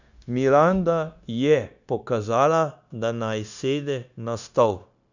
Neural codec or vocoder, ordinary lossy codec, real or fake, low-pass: codec, 16 kHz, 0.9 kbps, LongCat-Audio-Codec; none; fake; 7.2 kHz